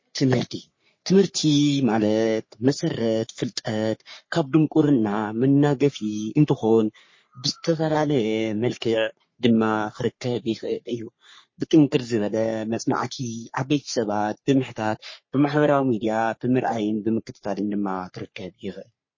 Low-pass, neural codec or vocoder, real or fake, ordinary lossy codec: 7.2 kHz; codec, 44.1 kHz, 3.4 kbps, Pupu-Codec; fake; MP3, 32 kbps